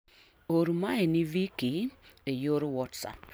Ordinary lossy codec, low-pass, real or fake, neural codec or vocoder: none; none; real; none